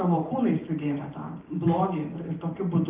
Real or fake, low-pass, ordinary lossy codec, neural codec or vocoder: fake; 3.6 kHz; Opus, 24 kbps; vocoder, 44.1 kHz, 128 mel bands every 512 samples, BigVGAN v2